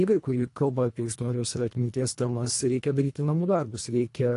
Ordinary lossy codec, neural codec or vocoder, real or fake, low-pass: AAC, 48 kbps; codec, 24 kHz, 1.5 kbps, HILCodec; fake; 10.8 kHz